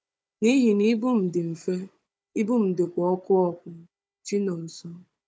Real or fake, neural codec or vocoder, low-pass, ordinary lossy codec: fake; codec, 16 kHz, 16 kbps, FunCodec, trained on Chinese and English, 50 frames a second; none; none